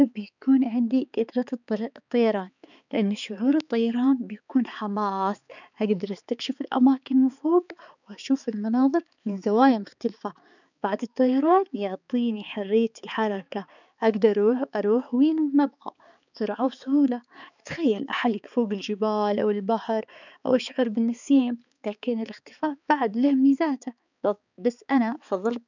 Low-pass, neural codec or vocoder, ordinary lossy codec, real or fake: 7.2 kHz; codec, 16 kHz, 4 kbps, X-Codec, HuBERT features, trained on balanced general audio; none; fake